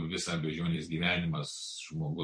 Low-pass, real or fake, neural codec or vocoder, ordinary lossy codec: 9.9 kHz; fake; vocoder, 44.1 kHz, 128 mel bands every 256 samples, BigVGAN v2; MP3, 48 kbps